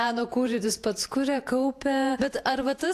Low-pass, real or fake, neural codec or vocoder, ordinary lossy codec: 14.4 kHz; fake; vocoder, 48 kHz, 128 mel bands, Vocos; AAC, 64 kbps